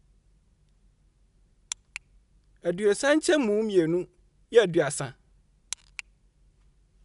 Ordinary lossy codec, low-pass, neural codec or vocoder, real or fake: none; 10.8 kHz; none; real